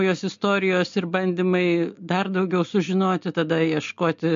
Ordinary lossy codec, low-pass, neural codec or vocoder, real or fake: MP3, 48 kbps; 7.2 kHz; none; real